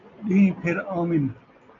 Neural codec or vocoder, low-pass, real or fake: none; 7.2 kHz; real